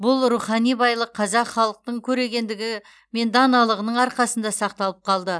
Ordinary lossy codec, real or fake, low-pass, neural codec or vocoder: none; real; none; none